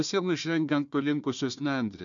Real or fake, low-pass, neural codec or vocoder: fake; 7.2 kHz; codec, 16 kHz, 1 kbps, FunCodec, trained on Chinese and English, 50 frames a second